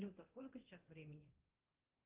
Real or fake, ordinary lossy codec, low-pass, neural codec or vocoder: fake; Opus, 16 kbps; 3.6 kHz; codec, 24 kHz, 0.9 kbps, DualCodec